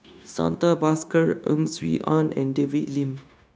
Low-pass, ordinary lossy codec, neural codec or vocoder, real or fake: none; none; codec, 16 kHz, 0.9 kbps, LongCat-Audio-Codec; fake